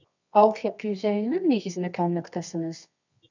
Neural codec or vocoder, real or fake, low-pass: codec, 24 kHz, 0.9 kbps, WavTokenizer, medium music audio release; fake; 7.2 kHz